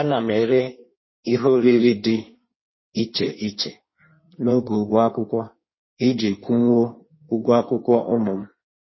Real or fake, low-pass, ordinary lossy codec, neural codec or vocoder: fake; 7.2 kHz; MP3, 24 kbps; codec, 16 kHz in and 24 kHz out, 1.1 kbps, FireRedTTS-2 codec